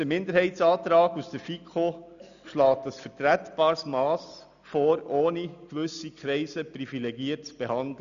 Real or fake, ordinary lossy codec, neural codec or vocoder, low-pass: real; none; none; 7.2 kHz